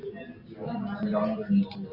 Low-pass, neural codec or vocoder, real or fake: 5.4 kHz; none; real